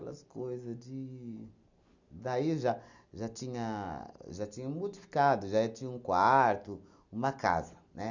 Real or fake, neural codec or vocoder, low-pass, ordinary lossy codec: real; none; 7.2 kHz; none